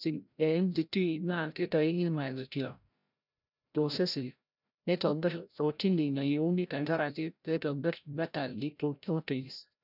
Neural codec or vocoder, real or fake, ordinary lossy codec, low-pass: codec, 16 kHz, 0.5 kbps, FreqCodec, larger model; fake; none; 5.4 kHz